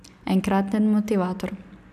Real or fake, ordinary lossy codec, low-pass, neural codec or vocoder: real; none; 14.4 kHz; none